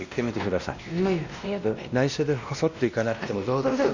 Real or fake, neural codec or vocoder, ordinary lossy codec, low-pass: fake; codec, 16 kHz, 1 kbps, X-Codec, WavLM features, trained on Multilingual LibriSpeech; Opus, 64 kbps; 7.2 kHz